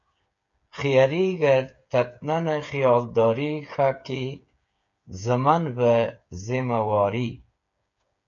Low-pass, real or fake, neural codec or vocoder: 7.2 kHz; fake; codec, 16 kHz, 8 kbps, FreqCodec, smaller model